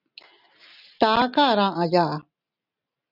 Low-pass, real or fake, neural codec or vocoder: 5.4 kHz; real; none